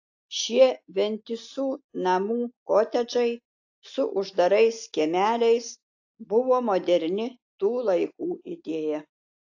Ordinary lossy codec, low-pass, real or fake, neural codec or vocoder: AAC, 48 kbps; 7.2 kHz; real; none